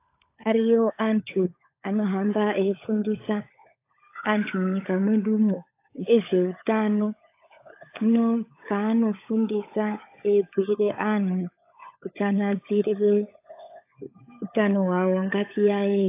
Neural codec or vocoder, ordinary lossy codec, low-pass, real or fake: codec, 16 kHz, 16 kbps, FunCodec, trained on LibriTTS, 50 frames a second; AAC, 24 kbps; 3.6 kHz; fake